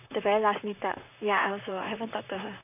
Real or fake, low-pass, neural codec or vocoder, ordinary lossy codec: fake; 3.6 kHz; vocoder, 44.1 kHz, 128 mel bands, Pupu-Vocoder; none